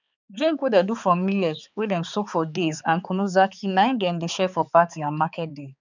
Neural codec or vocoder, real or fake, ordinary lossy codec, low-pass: codec, 16 kHz, 4 kbps, X-Codec, HuBERT features, trained on balanced general audio; fake; none; 7.2 kHz